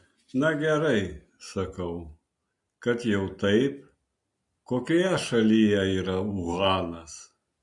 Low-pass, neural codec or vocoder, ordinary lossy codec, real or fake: 10.8 kHz; none; MP3, 48 kbps; real